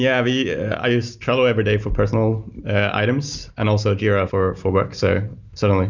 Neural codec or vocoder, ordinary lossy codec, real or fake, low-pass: none; Opus, 64 kbps; real; 7.2 kHz